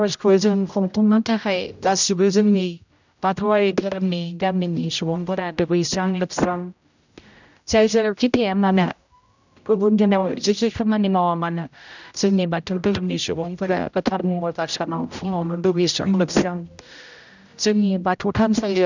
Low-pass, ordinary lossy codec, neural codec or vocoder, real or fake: 7.2 kHz; none; codec, 16 kHz, 0.5 kbps, X-Codec, HuBERT features, trained on general audio; fake